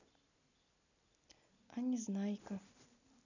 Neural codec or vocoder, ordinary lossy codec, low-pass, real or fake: none; none; 7.2 kHz; real